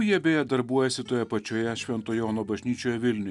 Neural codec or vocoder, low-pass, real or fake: none; 10.8 kHz; real